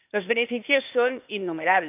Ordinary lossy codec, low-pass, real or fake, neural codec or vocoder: none; 3.6 kHz; fake; codec, 16 kHz, 0.8 kbps, ZipCodec